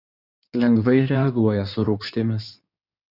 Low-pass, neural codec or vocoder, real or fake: 5.4 kHz; codec, 16 kHz in and 24 kHz out, 2.2 kbps, FireRedTTS-2 codec; fake